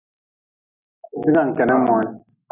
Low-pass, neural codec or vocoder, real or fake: 3.6 kHz; none; real